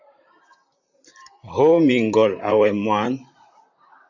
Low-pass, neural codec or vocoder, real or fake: 7.2 kHz; vocoder, 44.1 kHz, 128 mel bands, Pupu-Vocoder; fake